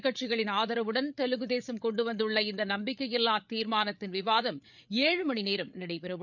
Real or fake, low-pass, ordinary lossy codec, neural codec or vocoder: fake; 7.2 kHz; none; codec, 16 kHz, 8 kbps, FreqCodec, larger model